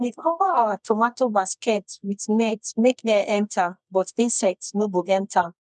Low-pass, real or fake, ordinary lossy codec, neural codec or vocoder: 10.8 kHz; fake; none; codec, 24 kHz, 0.9 kbps, WavTokenizer, medium music audio release